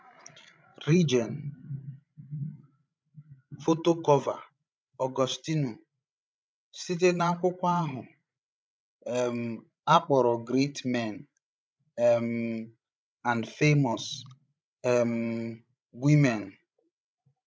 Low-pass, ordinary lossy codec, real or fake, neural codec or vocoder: none; none; fake; codec, 16 kHz, 16 kbps, FreqCodec, larger model